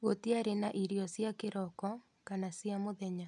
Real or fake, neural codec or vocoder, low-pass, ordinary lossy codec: real; none; 10.8 kHz; none